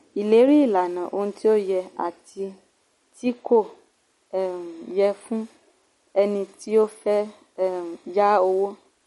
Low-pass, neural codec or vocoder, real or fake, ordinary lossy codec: 19.8 kHz; none; real; MP3, 48 kbps